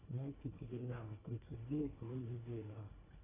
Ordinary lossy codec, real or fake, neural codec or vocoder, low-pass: AAC, 16 kbps; fake; codec, 24 kHz, 1.5 kbps, HILCodec; 3.6 kHz